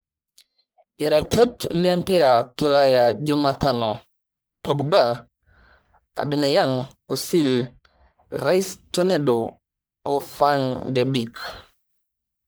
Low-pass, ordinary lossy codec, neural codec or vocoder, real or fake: none; none; codec, 44.1 kHz, 1.7 kbps, Pupu-Codec; fake